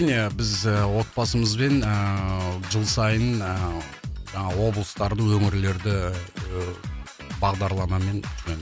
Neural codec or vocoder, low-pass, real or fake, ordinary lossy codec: none; none; real; none